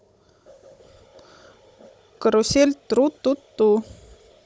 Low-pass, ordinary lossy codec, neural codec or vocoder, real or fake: none; none; codec, 16 kHz, 16 kbps, FunCodec, trained on Chinese and English, 50 frames a second; fake